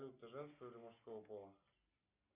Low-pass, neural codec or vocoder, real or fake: 3.6 kHz; none; real